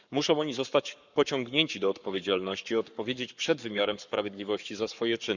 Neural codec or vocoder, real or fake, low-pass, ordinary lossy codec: codec, 44.1 kHz, 7.8 kbps, Pupu-Codec; fake; 7.2 kHz; none